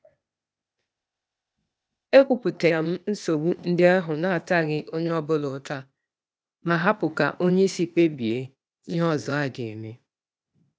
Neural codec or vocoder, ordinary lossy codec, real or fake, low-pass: codec, 16 kHz, 0.8 kbps, ZipCodec; none; fake; none